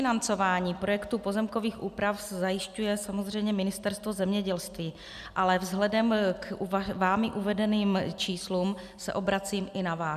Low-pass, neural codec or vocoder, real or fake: 14.4 kHz; none; real